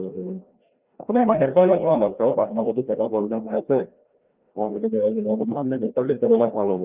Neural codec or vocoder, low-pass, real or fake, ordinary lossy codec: codec, 16 kHz, 1 kbps, FreqCodec, larger model; 3.6 kHz; fake; Opus, 32 kbps